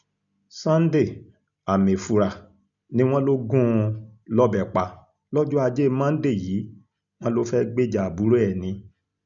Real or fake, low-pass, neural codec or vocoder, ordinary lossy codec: real; 7.2 kHz; none; none